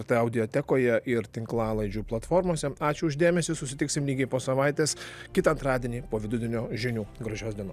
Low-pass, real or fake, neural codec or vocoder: 14.4 kHz; real; none